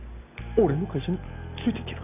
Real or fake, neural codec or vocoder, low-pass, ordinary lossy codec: real; none; 3.6 kHz; none